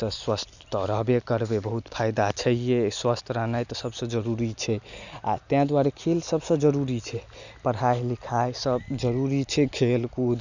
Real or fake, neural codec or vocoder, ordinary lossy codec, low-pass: real; none; none; 7.2 kHz